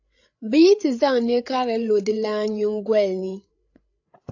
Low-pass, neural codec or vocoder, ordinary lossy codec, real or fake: 7.2 kHz; codec, 16 kHz, 8 kbps, FreqCodec, larger model; AAC, 48 kbps; fake